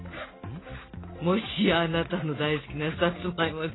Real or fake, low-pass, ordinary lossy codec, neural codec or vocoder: real; 7.2 kHz; AAC, 16 kbps; none